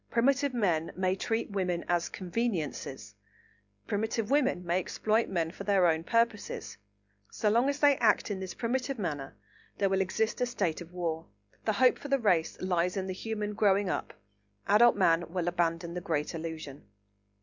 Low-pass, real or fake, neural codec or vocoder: 7.2 kHz; real; none